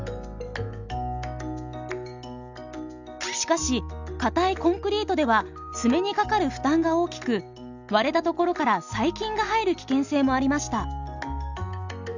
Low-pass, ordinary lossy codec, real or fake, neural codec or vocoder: 7.2 kHz; none; real; none